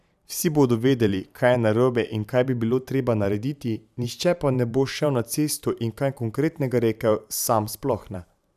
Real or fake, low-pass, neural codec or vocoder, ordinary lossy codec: fake; 14.4 kHz; vocoder, 44.1 kHz, 128 mel bands every 512 samples, BigVGAN v2; none